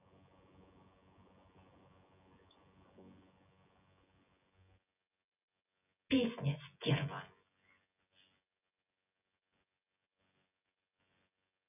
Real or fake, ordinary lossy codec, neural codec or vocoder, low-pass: fake; none; vocoder, 24 kHz, 100 mel bands, Vocos; 3.6 kHz